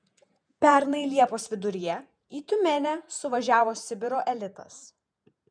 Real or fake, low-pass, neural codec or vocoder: fake; 9.9 kHz; vocoder, 48 kHz, 128 mel bands, Vocos